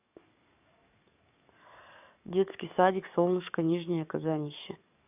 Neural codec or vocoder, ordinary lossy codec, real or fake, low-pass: codec, 44.1 kHz, 7.8 kbps, DAC; none; fake; 3.6 kHz